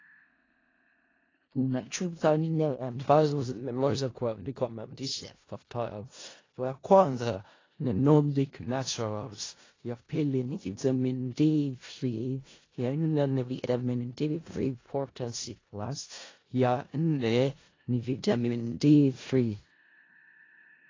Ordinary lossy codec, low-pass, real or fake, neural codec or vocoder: AAC, 32 kbps; 7.2 kHz; fake; codec, 16 kHz in and 24 kHz out, 0.4 kbps, LongCat-Audio-Codec, four codebook decoder